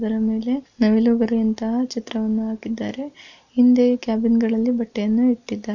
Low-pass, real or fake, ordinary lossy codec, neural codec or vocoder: 7.2 kHz; real; none; none